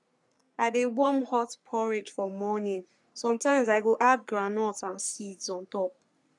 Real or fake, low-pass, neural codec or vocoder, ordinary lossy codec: fake; 10.8 kHz; codec, 44.1 kHz, 3.4 kbps, Pupu-Codec; none